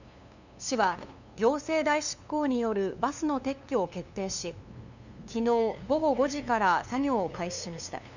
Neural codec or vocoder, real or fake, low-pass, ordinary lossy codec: codec, 16 kHz, 2 kbps, FunCodec, trained on LibriTTS, 25 frames a second; fake; 7.2 kHz; none